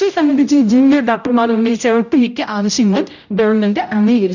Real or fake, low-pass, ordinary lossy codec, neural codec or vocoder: fake; 7.2 kHz; none; codec, 16 kHz, 0.5 kbps, X-Codec, HuBERT features, trained on general audio